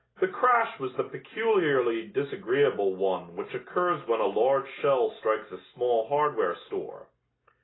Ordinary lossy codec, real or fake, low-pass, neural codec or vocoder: AAC, 16 kbps; real; 7.2 kHz; none